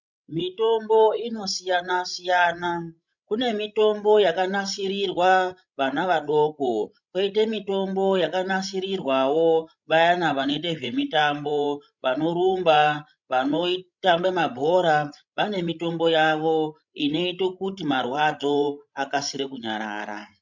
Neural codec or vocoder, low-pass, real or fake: codec, 16 kHz, 16 kbps, FreqCodec, larger model; 7.2 kHz; fake